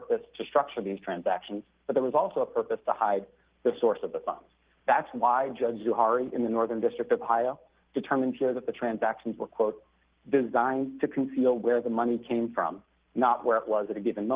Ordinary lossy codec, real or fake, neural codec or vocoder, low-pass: Opus, 16 kbps; real; none; 3.6 kHz